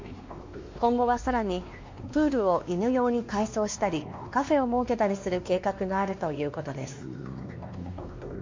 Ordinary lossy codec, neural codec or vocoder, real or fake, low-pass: MP3, 48 kbps; codec, 16 kHz, 2 kbps, X-Codec, WavLM features, trained on Multilingual LibriSpeech; fake; 7.2 kHz